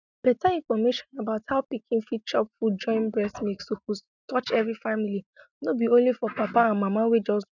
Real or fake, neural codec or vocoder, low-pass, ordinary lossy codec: real; none; 7.2 kHz; none